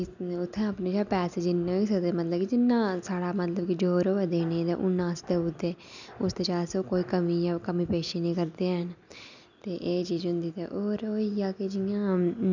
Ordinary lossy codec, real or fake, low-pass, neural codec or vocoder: none; real; 7.2 kHz; none